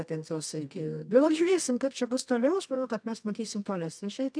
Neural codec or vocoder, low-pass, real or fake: codec, 24 kHz, 0.9 kbps, WavTokenizer, medium music audio release; 9.9 kHz; fake